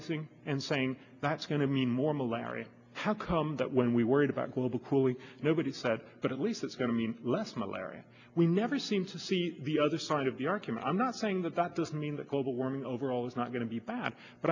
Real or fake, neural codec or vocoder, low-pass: real; none; 7.2 kHz